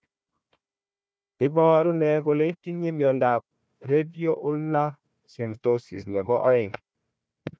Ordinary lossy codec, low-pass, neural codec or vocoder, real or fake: none; none; codec, 16 kHz, 1 kbps, FunCodec, trained on Chinese and English, 50 frames a second; fake